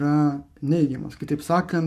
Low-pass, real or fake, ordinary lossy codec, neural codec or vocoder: 14.4 kHz; fake; MP3, 96 kbps; codec, 44.1 kHz, 7.8 kbps, Pupu-Codec